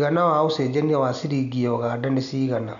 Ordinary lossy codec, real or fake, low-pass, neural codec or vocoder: MP3, 96 kbps; real; 7.2 kHz; none